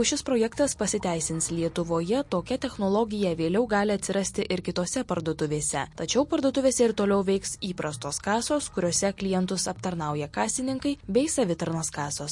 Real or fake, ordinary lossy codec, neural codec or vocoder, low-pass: real; MP3, 48 kbps; none; 10.8 kHz